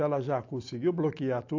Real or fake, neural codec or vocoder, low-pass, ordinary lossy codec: real; none; 7.2 kHz; AAC, 48 kbps